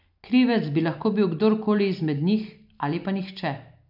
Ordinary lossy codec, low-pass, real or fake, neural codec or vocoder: none; 5.4 kHz; real; none